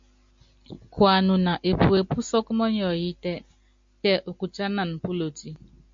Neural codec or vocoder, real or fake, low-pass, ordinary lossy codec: none; real; 7.2 kHz; MP3, 48 kbps